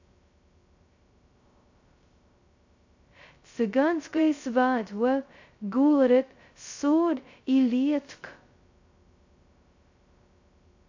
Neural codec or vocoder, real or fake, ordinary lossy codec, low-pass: codec, 16 kHz, 0.2 kbps, FocalCodec; fake; AAC, 48 kbps; 7.2 kHz